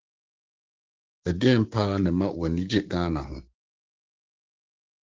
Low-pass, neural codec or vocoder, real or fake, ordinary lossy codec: 7.2 kHz; codec, 16 kHz, 6 kbps, DAC; fake; Opus, 16 kbps